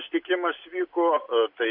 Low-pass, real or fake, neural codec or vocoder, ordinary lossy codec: 5.4 kHz; real; none; MP3, 48 kbps